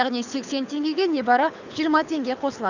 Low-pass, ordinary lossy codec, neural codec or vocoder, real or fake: 7.2 kHz; none; codec, 24 kHz, 6 kbps, HILCodec; fake